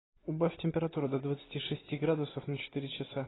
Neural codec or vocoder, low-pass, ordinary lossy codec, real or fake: none; 7.2 kHz; AAC, 16 kbps; real